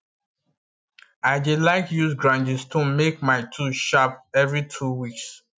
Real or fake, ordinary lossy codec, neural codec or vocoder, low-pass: real; none; none; none